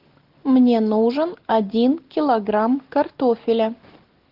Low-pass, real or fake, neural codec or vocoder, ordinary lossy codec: 5.4 kHz; real; none; Opus, 16 kbps